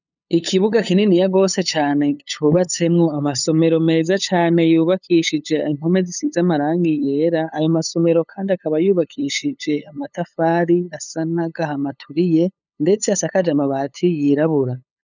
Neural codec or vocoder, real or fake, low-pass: codec, 16 kHz, 8 kbps, FunCodec, trained on LibriTTS, 25 frames a second; fake; 7.2 kHz